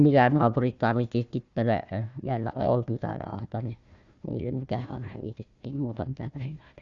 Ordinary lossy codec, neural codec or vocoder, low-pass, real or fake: Opus, 64 kbps; codec, 16 kHz, 1 kbps, FunCodec, trained on Chinese and English, 50 frames a second; 7.2 kHz; fake